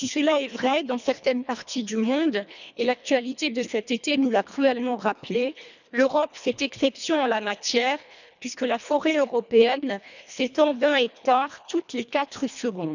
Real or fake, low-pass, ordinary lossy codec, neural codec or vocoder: fake; 7.2 kHz; none; codec, 24 kHz, 1.5 kbps, HILCodec